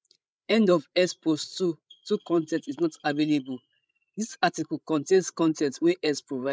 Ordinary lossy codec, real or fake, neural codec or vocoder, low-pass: none; fake; codec, 16 kHz, 16 kbps, FreqCodec, larger model; none